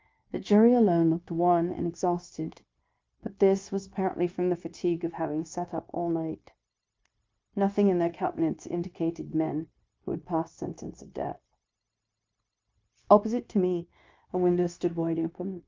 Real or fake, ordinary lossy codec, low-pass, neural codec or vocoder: fake; Opus, 24 kbps; 7.2 kHz; codec, 16 kHz, 0.9 kbps, LongCat-Audio-Codec